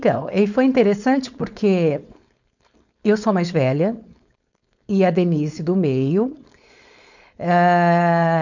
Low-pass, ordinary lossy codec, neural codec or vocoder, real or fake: 7.2 kHz; none; codec, 16 kHz, 4.8 kbps, FACodec; fake